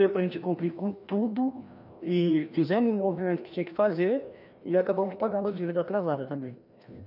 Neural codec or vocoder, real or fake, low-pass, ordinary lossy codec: codec, 16 kHz, 1 kbps, FreqCodec, larger model; fake; 5.4 kHz; none